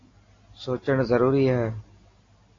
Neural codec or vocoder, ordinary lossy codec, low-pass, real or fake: none; AAC, 32 kbps; 7.2 kHz; real